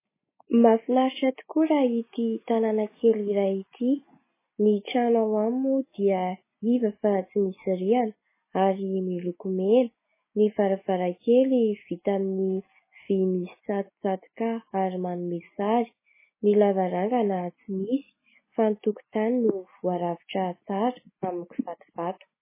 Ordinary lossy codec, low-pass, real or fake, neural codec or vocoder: MP3, 16 kbps; 3.6 kHz; real; none